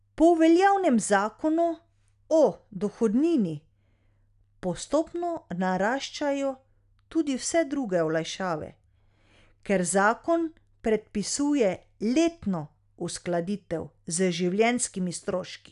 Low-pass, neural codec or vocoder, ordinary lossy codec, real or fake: 10.8 kHz; none; none; real